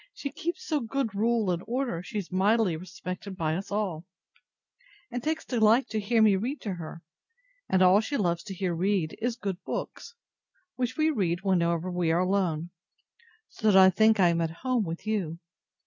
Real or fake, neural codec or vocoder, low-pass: real; none; 7.2 kHz